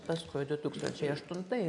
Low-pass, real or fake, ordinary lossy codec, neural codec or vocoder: 10.8 kHz; real; AAC, 64 kbps; none